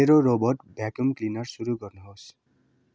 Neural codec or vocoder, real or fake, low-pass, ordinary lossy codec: none; real; none; none